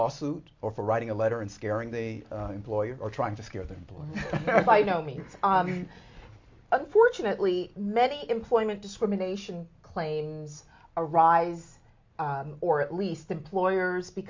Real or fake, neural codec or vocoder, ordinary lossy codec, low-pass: real; none; MP3, 48 kbps; 7.2 kHz